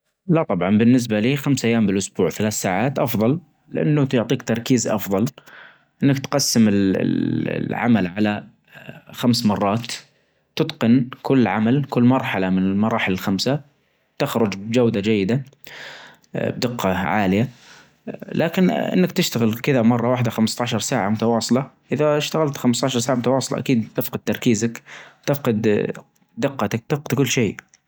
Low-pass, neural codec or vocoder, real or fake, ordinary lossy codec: none; none; real; none